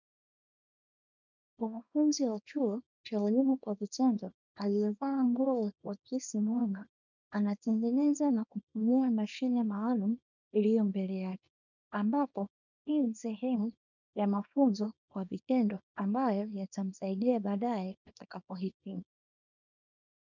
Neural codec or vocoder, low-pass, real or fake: codec, 24 kHz, 0.9 kbps, WavTokenizer, small release; 7.2 kHz; fake